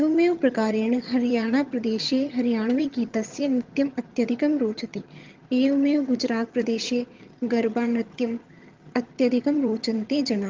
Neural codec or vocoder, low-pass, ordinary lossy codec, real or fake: vocoder, 22.05 kHz, 80 mel bands, HiFi-GAN; 7.2 kHz; Opus, 32 kbps; fake